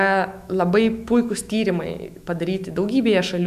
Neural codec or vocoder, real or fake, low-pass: autoencoder, 48 kHz, 128 numbers a frame, DAC-VAE, trained on Japanese speech; fake; 14.4 kHz